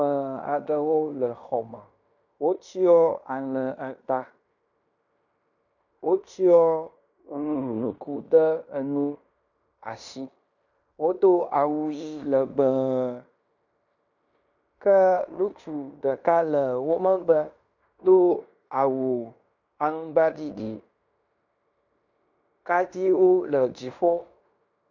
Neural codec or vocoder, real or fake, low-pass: codec, 16 kHz in and 24 kHz out, 0.9 kbps, LongCat-Audio-Codec, fine tuned four codebook decoder; fake; 7.2 kHz